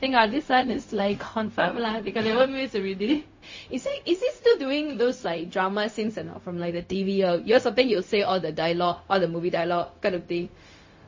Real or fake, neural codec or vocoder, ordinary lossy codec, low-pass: fake; codec, 16 kHz, 0.4 kbps, LongCat-Audio-Codec; MP3, 32 kbps; 7.2 kHz